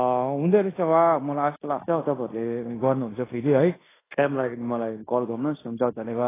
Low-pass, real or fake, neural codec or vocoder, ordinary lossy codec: 3.6 kHz; fake; codec, 16 kHz in and 24 kHz out, 0.9 kbps, LongCat-Audio-Codec, fine tuned four codebook decoder; AAC, 16 kbps